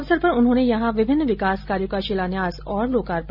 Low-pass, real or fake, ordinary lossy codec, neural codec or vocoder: 5.4 kHz; real; none; none